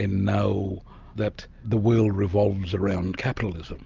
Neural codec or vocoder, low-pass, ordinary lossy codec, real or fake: none; 7.2 kHz; Opus, 24 kbps; real